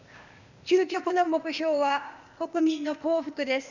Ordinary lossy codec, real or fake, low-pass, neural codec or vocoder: none; fake; 7.2 kHz; codec, 16 kHz, 0.8 kbps, ZipCodec